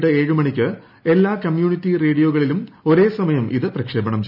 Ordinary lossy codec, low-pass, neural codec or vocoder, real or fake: none; 5.4 kHz; none; real